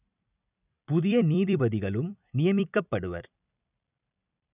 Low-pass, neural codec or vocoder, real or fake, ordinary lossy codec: 3.6 kHz; vocoder, 44.1 kHz, 128 mel bands every 256 samples, BigVGAN v2; fake; none